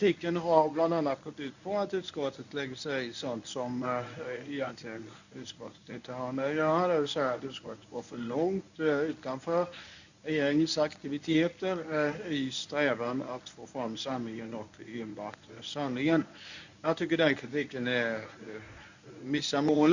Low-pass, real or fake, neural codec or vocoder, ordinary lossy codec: 7.2 kHz; fake; codec, 24 kHz, 0.9 kbps, WavTokenizer, medium speech release version 1; none